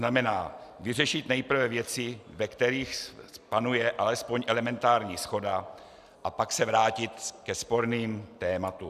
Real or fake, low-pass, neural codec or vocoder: real; 14.4 kHz; none